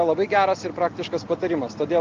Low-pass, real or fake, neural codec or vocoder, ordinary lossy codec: 7.2 kHz; real; none; Opus, 32 kbps